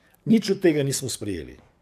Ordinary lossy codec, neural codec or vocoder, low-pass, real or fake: AAC, 96 kbps; codec, 44.1 kHz, 7.8 kbps, DAC; 14.4 kHz; fake